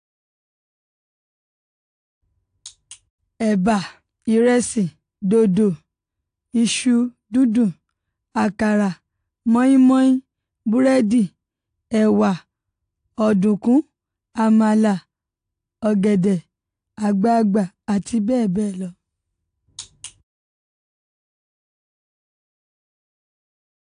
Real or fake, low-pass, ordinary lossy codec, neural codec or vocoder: real; 9.9 kHz; AAC, 64 kbps; none